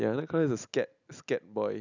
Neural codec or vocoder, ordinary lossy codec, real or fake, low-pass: none; none; real; 7.2 kHz